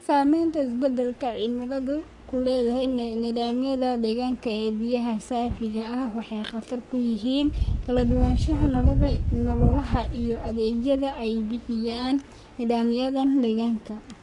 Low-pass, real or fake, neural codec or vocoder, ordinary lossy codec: 10.8 kHz; fake; codec, 44.1 kHz, 3.4 kbps, Pupu-Codec; none